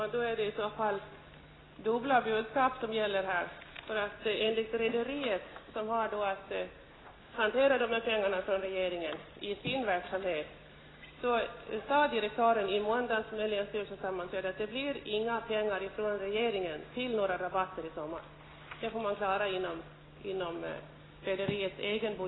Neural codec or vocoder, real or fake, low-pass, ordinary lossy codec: none; real; 7.2 kHz; AAC, 16 kbps